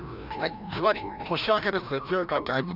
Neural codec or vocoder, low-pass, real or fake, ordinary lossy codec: codec, 16 kHz, 1 kbps, FreqCodec, larger model; 5.4 kHz; fake; none